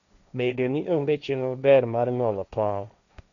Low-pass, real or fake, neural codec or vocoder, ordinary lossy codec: 7.2 kHz; fake; codec, 16 kHz, 1.1 kbps, Voila-Tokenizer; none